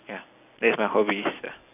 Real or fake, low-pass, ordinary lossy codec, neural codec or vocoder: real; 3.6 kHz; AAC, 32 kbps; none